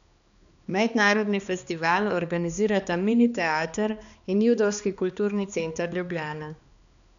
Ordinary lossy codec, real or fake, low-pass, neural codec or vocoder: none; fake; 7.2 kHz; codec, 16 kHz, 2 kbps, X-Codec, HuBERT features, trained on balanced general audio